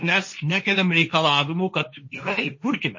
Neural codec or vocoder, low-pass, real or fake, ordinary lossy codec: codec, 16 kHz, 1.1 kbps, Voila-Tokenizer; 7.2 kHz; fake; MP3, 32 kbps